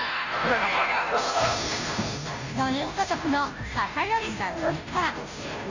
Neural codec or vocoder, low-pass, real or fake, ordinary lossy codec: codec, 16 kHz, 0.5 kbps, FunCodec, trained on Chinese and English, 25 frames a second; 7.2 kHz; fake; AAC, 48 kbps